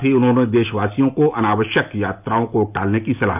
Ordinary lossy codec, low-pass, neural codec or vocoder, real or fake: none; 3.6 kHz; none; real